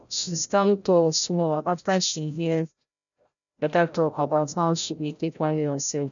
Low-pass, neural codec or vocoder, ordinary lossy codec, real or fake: 7.2 kHz; codec, 16 kHz, 0.5 kbps, FreqCodec, larger model; none; fake